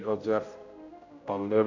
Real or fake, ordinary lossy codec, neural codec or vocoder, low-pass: fake; none; codec, 16 kHz, 0.5 kbps, X-Codec, HuBERT features, trained on general audio; 7.2 kHz